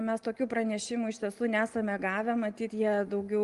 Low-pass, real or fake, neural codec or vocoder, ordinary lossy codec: 10.8 kHz; real; none; Opus, 24 kbps